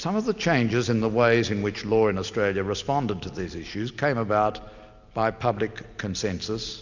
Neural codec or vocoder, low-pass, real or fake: none; 7.2 kHz; real